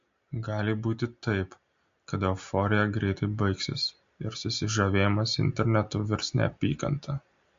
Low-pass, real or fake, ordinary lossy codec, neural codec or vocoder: 7.2 kHz; real; MP3, 48 kbps; none